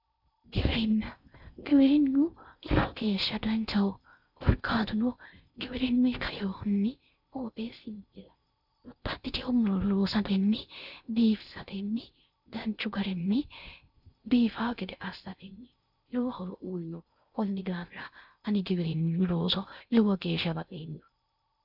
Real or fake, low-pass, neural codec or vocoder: fake; 5.4 kHz; codec, 16 kHz in and 24 kHz out, 0.6 kbps, FocalCodec, streaming, 4096 codes